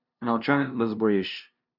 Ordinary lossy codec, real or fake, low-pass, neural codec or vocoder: none; fake; 5.4 kHz; codec, 16 kHz, 0.5 kbps, FunCodec, trained on LibriTTS, 25 frames a second